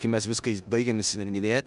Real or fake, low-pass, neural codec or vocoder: fake; 10.8 kHz; codec, 16 kHz in and 24 kHz out, 0.9 kbps, LongCat-Audio-Codec, four codebook decoder